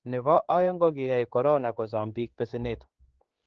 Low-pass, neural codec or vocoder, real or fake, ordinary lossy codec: 7.2 kHz; codec, 16 kHz, 4 kbps, X-Codec, HuBERT features, trained on general audio; fake; Opus, 16 kbps